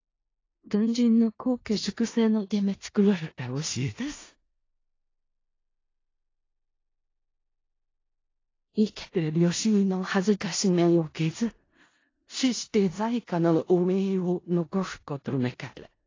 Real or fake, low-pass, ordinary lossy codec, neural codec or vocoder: fake; 7.2 kHz; AAC, 32 kbps; codec, 16 kHz in and 24 kHz out, 0.4 kbps, LongCat-Audio-Codec, four codebook decoder